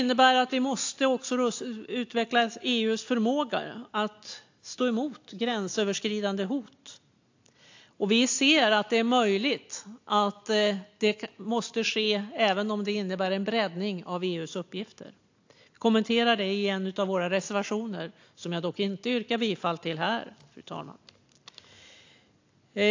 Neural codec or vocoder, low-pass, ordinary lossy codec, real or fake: none; 7.2 kHz; AAC, 48 kbps; real